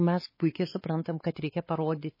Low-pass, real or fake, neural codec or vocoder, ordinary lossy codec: 5.4 kHz; fake; codec, 16 kHz, 4 kbps, X-Codec, WavLM features, trained on Multilingual LibriSpeech; MP3, 24 kbps